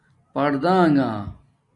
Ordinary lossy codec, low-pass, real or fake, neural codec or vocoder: AAC, 48 kbps; 10.8 kHz; real; none